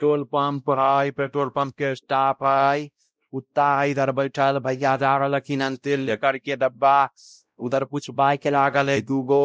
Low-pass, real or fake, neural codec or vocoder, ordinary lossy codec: none; fake; codec, 16 kHz, 0.5 kbps, X-Codec, WavLM features, trained on Multilingual LibriSpeech; none